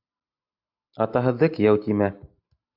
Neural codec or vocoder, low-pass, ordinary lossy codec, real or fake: none; 5.4 kHz; AAC, 48 kbps; real